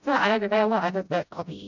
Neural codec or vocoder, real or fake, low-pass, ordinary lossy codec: codec, 16 kHz, 0.5 kbps, FreqCodec, smaller model; fake; 7.2 kHz; none